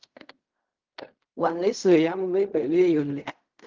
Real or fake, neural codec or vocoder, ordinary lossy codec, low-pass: fake; codec, 16 kHz in and 24 kHz out, 0.4 kbps, LongCat-Audio-Codec, fine tuned four codebook decoder; Opus, 24 kbps; 7.2 kHz